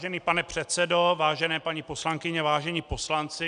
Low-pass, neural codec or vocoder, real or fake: 9.9 kHz; none; real